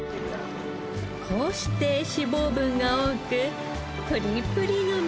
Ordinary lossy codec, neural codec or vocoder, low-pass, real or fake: none; none; none; real